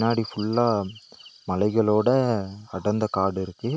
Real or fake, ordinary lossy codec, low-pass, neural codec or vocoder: real; none; none; none